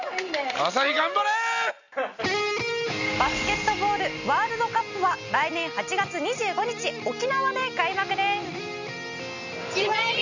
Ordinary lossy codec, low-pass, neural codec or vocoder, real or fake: none; 7.2 kHz; none; real